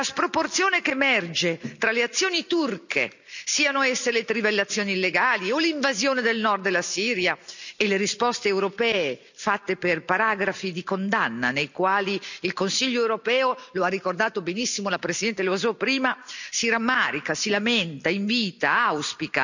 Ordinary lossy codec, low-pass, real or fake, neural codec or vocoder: none; 7.2 kHz; real; none